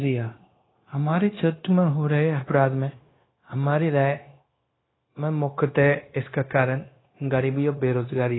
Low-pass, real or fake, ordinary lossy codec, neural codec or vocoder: 7.2 kHz; fake; AAC, 16 kbps; codec, 16 kHz, 0.9 kbps, LongCat-Audio-Codec